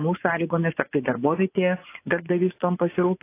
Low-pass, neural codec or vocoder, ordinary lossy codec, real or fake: 3.6 kHz; vocoder, 24 kHz, 100 mel bands, Vocos; AAC, 24 kbps; fake